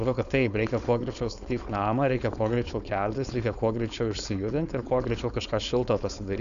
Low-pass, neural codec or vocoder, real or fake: 7.2 kHz; codec, 16 kHz, 4.8 kbps, FACodec; fake